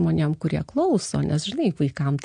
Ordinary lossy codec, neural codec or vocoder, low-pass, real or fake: MP3, 48 kbps; none; 9.9 kHz; real